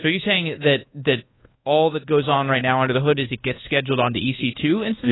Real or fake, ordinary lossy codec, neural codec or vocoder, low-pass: fake; AAC, 16 kbps; codec, 24 kHz, 1.2 kbps, DualCodec; 7.2 kHz